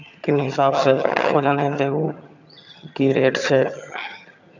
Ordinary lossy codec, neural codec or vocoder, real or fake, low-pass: none; vocoder, 22.05 kHz, 80 mel bands, HiFi-GAN; fake; 7.2 kHz